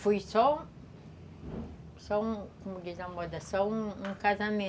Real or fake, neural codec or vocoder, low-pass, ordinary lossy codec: real; none; none; none